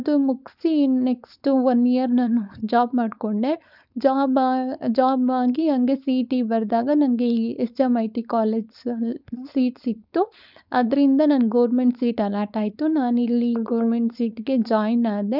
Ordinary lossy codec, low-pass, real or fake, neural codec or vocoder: none; 5.4 kHz; fake; codec, 16 kHz, 4.8 kbps, FACodec